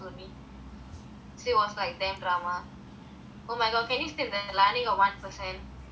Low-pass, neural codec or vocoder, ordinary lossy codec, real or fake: none; none; none; real